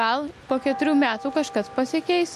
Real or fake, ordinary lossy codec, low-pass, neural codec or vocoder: real; MP3, 64 kbps; 14.4 kHz; none